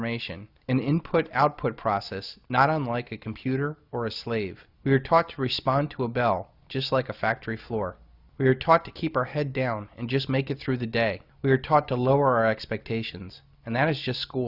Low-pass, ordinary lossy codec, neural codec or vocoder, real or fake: 5.4 kHz; Opus, 64 kbps; none; real